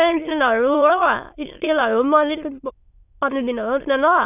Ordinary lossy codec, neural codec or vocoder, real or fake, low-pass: none; autoencoder, 22.05 kHz, a latent of 192 numbers a frame, VITS, trained on many speakers; fake; 3.6 kHz